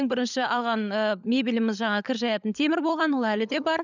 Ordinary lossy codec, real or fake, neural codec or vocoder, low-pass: none; fake; codec, 16 kHz, 16 kbps, FunCodec, trained on LibriTTS, 50 frames a second; 7.2 kHz